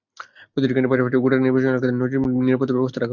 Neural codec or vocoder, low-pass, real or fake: none; 7.2 kHz; real